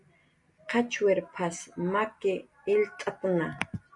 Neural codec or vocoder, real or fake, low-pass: none; real; 10.8 kHz